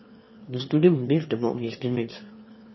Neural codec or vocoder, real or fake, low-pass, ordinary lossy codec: autoencoder, 22.05 kHz, a latent of 192 numbers a frame, VITS, trained on one speaker; fake; 7.2 kHz; MP3, 24 kbps